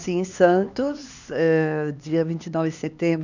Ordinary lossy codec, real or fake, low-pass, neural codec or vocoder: none; fake; 7.2 kHz; codec, 16 kHz, 2 kbps, X-Codec, HuBERT features, trained on LibriSpeech